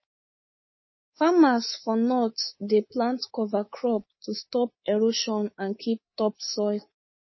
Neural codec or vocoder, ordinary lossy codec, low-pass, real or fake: codec, 24 kHz, 3.1 kbps, DualCodec; MP3, 24 kbps; 7.2 kHz; fake